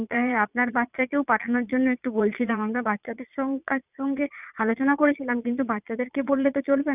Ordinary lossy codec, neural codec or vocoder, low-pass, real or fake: none; vocoder, 22.05 kHz, 80 mel bands, Vocos; 3.6 kHz; fake